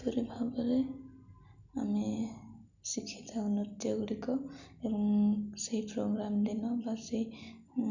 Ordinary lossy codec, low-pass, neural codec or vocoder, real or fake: none; 7.2 kHz; none; real